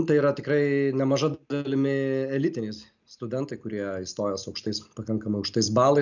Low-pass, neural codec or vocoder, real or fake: 7.2 kHz; none; real